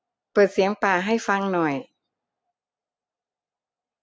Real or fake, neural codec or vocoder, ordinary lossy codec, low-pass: real; none; none; none